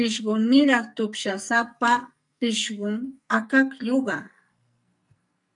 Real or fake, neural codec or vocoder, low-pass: fake; codec, 44.1 kHz, 2.6 kbps, SNAC; 10.8 kHz